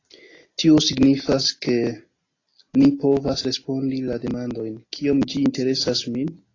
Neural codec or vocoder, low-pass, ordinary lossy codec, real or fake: none; 7.2 kHz; AAC, 32 kbps; real